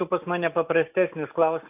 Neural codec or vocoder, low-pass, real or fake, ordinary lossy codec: none; 3.6 kHz; real; AAC, 32 kbps